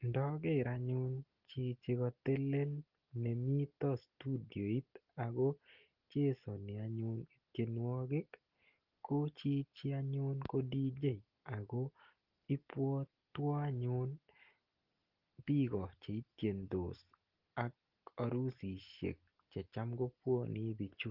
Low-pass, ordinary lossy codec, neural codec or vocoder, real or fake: 5.4 kHz; Opus, 16 kbps; none; real